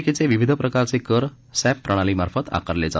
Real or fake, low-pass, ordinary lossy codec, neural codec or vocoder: real; none; none; none